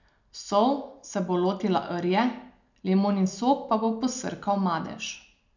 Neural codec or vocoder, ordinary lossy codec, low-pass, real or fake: none; none; 7.2 kHz; real